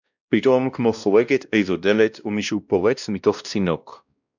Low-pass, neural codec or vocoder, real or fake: 7.2 kHz; codec, 16 kHz, 1 kbps, X-Codec, WavLM features, trained on Multilingual LibriSpeech; fake